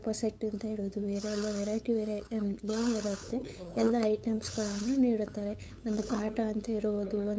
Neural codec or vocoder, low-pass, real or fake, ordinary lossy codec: codec, 16 kHz, 8 kbps, FunCodec, trained on LibriTTS, 25 frames a second; none; fake; none